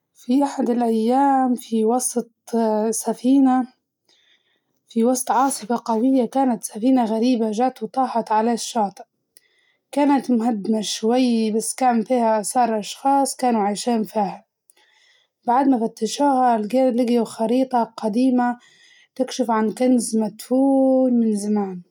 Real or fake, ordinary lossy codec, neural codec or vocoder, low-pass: real; none; none; 19.8 kHz